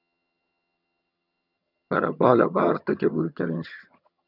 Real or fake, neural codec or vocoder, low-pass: fake; vocoder, 22.05 kHz, 80 mel bands, HiFi-GAN; 5.4 kHz